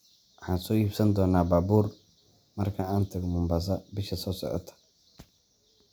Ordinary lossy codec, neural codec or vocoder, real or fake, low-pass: none; none; real; none